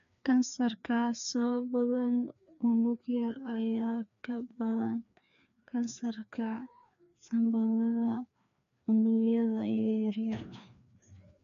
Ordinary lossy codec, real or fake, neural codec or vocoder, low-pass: none; fake; codec, 16 kHz, 2 kbps, FreqCodec, larger model; 7.2 kHz